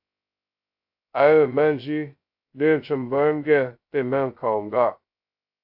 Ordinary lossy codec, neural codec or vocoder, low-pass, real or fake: AAC, 48 kbps; codec, 16 kHz, 0.2 kbps, FocalCodec; 5.4 kHz; fake